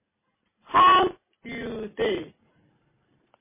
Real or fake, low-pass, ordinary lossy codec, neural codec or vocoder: real; 3.6 kHz; MP3, 16 kbps; none